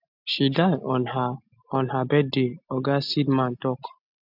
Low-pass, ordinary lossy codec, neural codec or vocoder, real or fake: 5.4 kHz; none; none; real